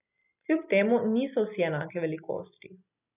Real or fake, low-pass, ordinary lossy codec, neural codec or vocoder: real; 3.6 kHz; none; none